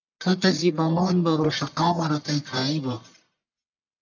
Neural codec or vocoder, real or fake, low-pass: codec, 44.1 kHz, 1.7 kbps, Pupu-Codec; fake; 7.2 kHz